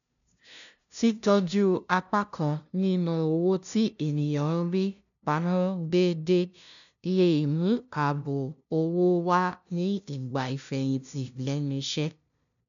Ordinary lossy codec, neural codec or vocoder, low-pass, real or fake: none; codec, 16 kHz, 0.5 kbps, FunCodec, trained on LibriTTS, 25 frames a second; 7.2 kHz; fake